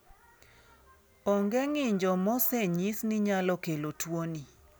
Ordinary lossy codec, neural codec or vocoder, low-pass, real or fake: none; none; none; real